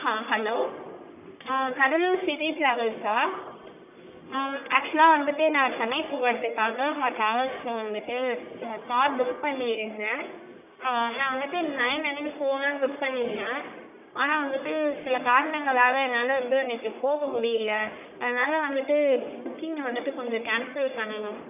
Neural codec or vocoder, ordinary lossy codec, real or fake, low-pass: codec, 44.1 kHz, 1.7 kbps, Pupu-Codec; none; fake; 3.6 kHz